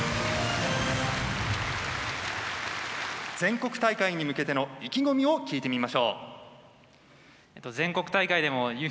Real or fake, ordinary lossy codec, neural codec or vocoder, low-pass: real; none; none; none